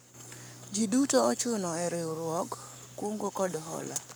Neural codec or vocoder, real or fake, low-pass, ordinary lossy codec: codec, 44.1 kHz, 7.8 kbps, Pupu-Codec; fake; none; none